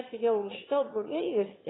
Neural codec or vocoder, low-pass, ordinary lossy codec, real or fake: autoencoder, 22.05 kHz, a latent of 192 numbers a frame, VITS, trained on one speaker; 7.2 kHz; AAC, 16 kbps; fake